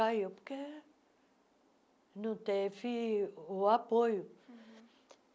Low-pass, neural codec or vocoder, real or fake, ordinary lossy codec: none; none; real; none